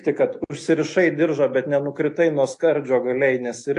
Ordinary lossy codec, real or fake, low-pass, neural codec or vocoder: AAC, 48 kbps; real; 10.8 kHz; none